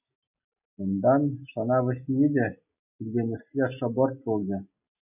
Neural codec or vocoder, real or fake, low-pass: none; real; 3.6 kHz